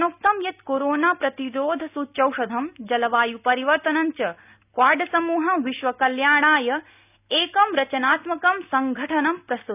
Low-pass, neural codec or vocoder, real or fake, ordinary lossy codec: 3.6 kHz; none; real; none